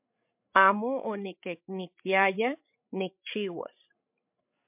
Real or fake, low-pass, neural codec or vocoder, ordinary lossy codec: fake; 3.6 kHz; codec, 16 kHz, 16 kbps, FreqCodec, larger model; MP3, 32 kbps